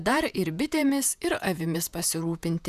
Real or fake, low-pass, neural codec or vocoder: fake; 14.4 kHz; vocoder, 48 kHz, 128 mel bands, Vocos